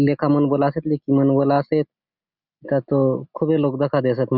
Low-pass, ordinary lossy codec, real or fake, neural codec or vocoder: 5.4 kHz; none; real; none